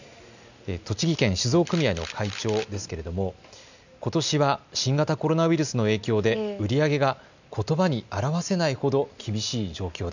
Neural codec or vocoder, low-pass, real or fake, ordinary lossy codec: none; 7.2 kHz; real; none